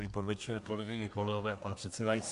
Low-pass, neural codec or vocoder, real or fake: 10.8 kHz; codec, 24 kHz, 1 kbps, SNAC; fake